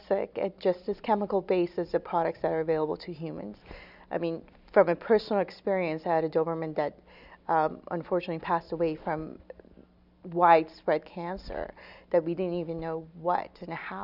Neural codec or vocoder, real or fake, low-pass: none; real; 5.4 kHz